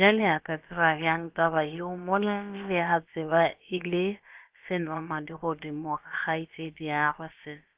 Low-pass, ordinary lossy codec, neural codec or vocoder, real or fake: 3.6 kHz; Opus, 32 kbps; codec, 16 kHz, about 1 kbps, DyCAST, with the encoder's durations; fake